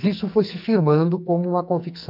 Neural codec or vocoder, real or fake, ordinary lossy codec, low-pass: codec, 44.1 kHz, 2.6 kbps, SNAC; fake; none; 5.4 kHz